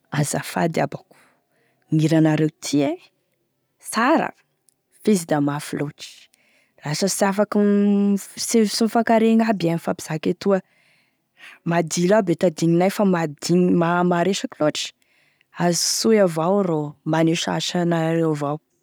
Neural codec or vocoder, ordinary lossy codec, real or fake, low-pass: none; none; real; none